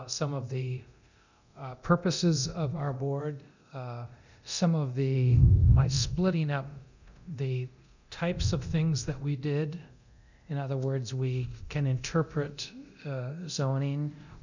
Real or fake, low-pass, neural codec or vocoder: fake; 7.2 kHz; codec, 24 kHz, 0.9 kbps, DualCodec